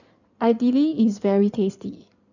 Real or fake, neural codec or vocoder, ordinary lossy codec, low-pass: fake; codec, 16 kHz in and 24 kHz out, 2.2 kbps, FireRedTTS-2 codec; none; 7.2 kHz